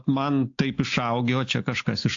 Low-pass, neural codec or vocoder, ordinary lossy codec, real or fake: 7.2 kHz; none; AAC, 48 kbps; real